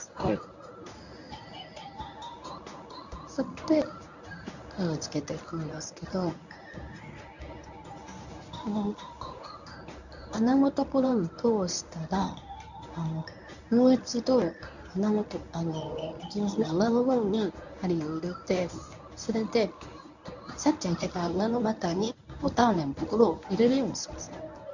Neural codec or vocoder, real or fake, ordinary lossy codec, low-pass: codec, 24 kHz, 0.9 kbps, WavTokenizer, medium speech release version 1; fake; none; 7.2 kHz